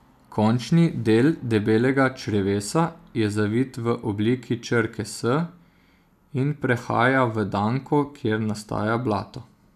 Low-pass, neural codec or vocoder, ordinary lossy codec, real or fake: 14.4 kHz; none; none; real